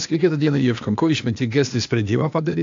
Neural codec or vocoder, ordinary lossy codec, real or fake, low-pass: codec, 16 kHz, 0.8 kbps, ZipCodec; AAC, 64 kbps; fake; 7.2 kHz